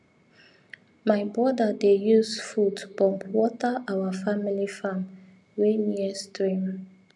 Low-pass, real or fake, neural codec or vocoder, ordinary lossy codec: 10.8 kHz; real; none; none